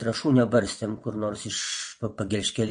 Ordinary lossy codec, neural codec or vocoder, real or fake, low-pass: MP3, 48 kbps; vocoder, 22.05 kHz, 80 mel bands, WaveNeXt; fake; 9.9 kHz